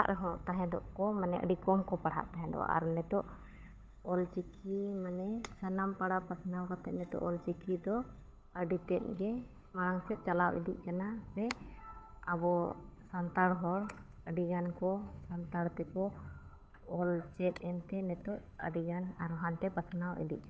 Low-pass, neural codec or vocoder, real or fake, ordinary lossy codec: none; codec, 16 kHz, 8 kbps, FunCodec, trained on Chinese and English, 25 frames a second; fake; none